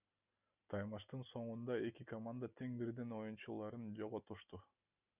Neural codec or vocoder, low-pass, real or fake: none; 3.6 kHz; real